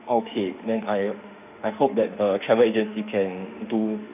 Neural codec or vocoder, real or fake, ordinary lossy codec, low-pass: autoencoder, 48 kHz, 32 numbers a frame, DAC-VAE, trained on Japanese speech; fake; none; 3.6 kHz